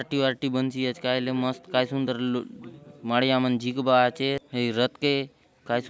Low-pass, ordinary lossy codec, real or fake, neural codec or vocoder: none; none; real; none